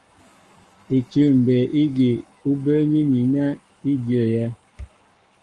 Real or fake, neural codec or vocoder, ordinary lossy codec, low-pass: fake; codec, 44.1 kHz, 7.8 kbps, Pupu-Codec; Opus, 32 kbps; 10.8 kHz